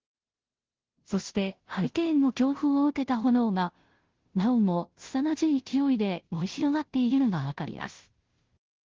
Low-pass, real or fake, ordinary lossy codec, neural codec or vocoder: 7.2 kHz; fake; Opus, 16 kbps; codec, 16 kHz, 0.5 kbps, FunCodec, trained on Chinese and English, 25 frames a second